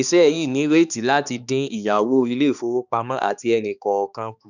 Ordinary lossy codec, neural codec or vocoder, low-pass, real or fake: none; codec, 16 kHz, 2 kbps, X-Codec, HuBERT features, trained on balanced general audio; 7.2 kHz; fake